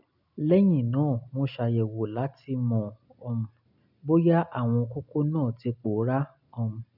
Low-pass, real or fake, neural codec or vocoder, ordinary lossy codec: 5.4 kHz; real; none; none